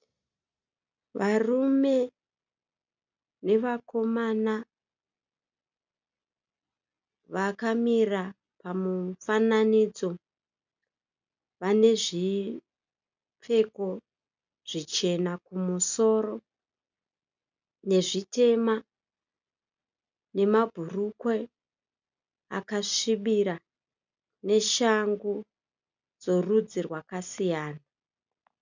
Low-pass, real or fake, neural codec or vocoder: 7.2 kHz; real; none